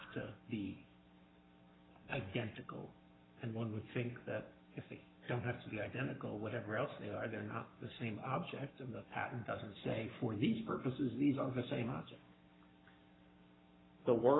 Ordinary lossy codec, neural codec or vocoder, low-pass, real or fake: AAC, 16 kbps; codec, 44.1 kHz, 7.8 kbps, Pupu-Codec; 7.2 kHz; fake